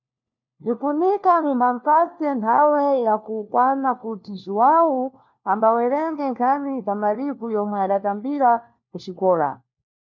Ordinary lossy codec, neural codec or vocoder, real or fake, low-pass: MP3, 48 kbps; codec, 16 kHz, 1 kbps, FunCodec, trained on LibriTTS, 50 frames a second; fake; 7.2 kHz